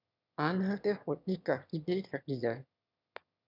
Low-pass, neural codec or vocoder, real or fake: 5.4 kHz; autoencoder, 22.05 kHz, a latent of 192 numbers a frame, VITS, trained on one speaker; fake